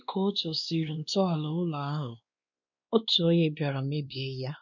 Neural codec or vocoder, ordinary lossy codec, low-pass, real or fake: codec, 16 kHz, 2 kbps, X-Codec, WavLM features, trained on Multilingual LibriSpeech; none; 7.2 kHz; fake